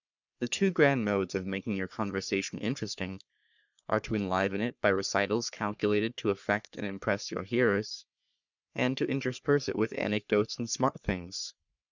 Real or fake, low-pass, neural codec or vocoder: fake; 7.2 kHz; codec, 44.1 kHz, 3.4 kbps, Pupu-Codec